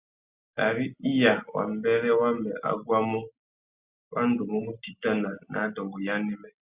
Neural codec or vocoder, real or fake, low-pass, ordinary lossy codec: none; real; 3.6 kHz; Opus, 64 kbps